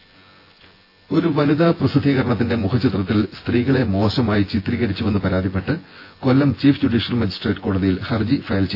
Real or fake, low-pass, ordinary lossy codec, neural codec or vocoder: fake; 5.4 kHz; AAC, 32 kbps; vocoder, 24 kHz, 100 mel bands, Vocos